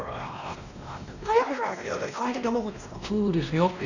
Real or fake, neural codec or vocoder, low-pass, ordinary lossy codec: fake; codec, 16 kHz, 1 kbps, X-Codec, WavLM features, trained on Multilingual LibriSpeech; 7.2 kHz; none